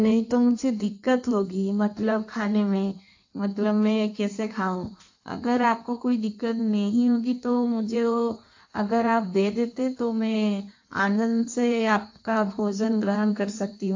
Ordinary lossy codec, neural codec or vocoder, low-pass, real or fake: AAC, 48 kbps; codec, 16 kHz in and 24 kHz out, 1.1 kbps, FireRedTTS-2 codec; 7.2 kHz; fake